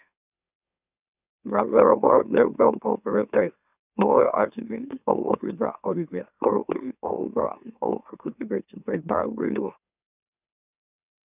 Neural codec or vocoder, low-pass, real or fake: autoencoder, 44.1 kHz, a latent of 192 numbers a frame, MeloTTS; 3.6 kHz; fake